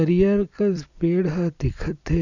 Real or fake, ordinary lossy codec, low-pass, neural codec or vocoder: fake; none; 7.2 kHz; vocoder, 44.1 kHz, 128 mel bands every 256 samples, BigVGAN v2